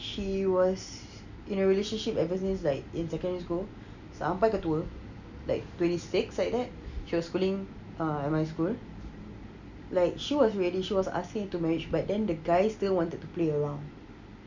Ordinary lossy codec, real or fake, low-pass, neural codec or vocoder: none; real; 7.2 kHz; none